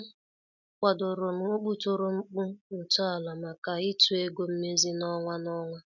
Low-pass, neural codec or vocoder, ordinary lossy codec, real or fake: 7.2 kHz; none; none; real